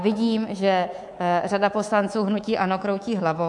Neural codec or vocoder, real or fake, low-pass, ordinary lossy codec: codec, 24 kHz, 3.1 kbps, DualCodec; fake; 10.8 kHz; MP3, 64 kbps